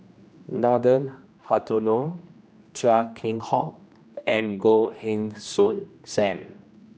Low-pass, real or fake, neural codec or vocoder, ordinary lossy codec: none; fake; codec, 16 kHz, 1 kbps, X-Codec, HuBERT features, trained on general audio; none